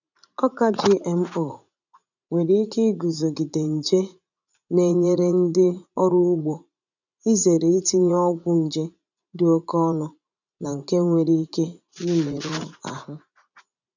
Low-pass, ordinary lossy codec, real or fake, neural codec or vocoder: 7.2 kHz; none; fake; vocoder, 44.1 kHz, 80 mel bands, Vocos